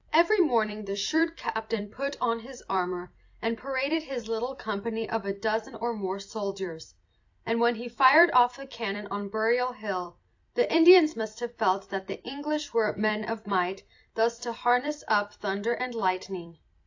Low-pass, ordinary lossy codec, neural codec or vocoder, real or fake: 7.2 kHz; AAC, 48 kbps; vocoder, 44.1 kHz, 128 mel bands every 256 samples, BigVGAN v2; fake